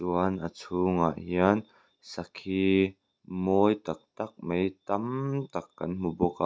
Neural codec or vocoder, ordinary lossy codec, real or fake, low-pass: none; none; real; none